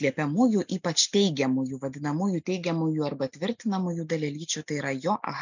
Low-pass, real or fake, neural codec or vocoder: 7.2 kHz; real; none